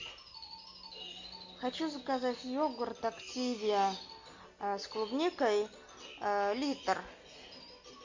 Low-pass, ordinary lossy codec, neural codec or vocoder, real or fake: 7.2 kHz; MP3, 48 kbps; none; real